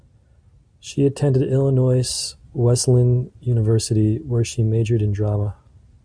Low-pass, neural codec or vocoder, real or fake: 9.9 kHz; none; real